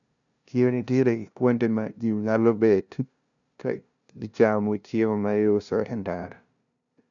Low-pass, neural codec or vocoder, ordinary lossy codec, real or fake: 7.2 kHz; codec, 16 kHz, 0.5 kbps, FunCodec, trained on LibriTTS, 25 frames a second; none; fake